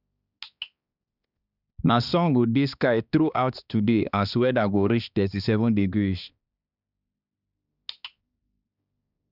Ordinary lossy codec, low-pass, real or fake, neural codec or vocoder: none; 5.4 kHz; fake; codec, 16 kHz, 2 kbps, X-Codec, HuBERT features, trained on balanced general audio